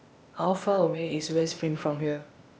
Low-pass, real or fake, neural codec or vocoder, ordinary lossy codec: none; fake; codec, 16 kHz, 0.8 kbps, ZipCodec; none